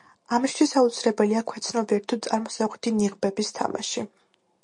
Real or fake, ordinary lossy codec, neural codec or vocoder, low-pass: real; MP3, 48 kbps; none; 10.8 kHz